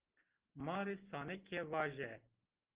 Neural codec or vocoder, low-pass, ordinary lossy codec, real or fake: none; 3.6 kHz; Opus, 16 kbps; real